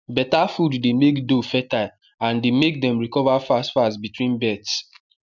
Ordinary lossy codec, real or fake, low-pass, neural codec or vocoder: none; real; 7.2 kHz; none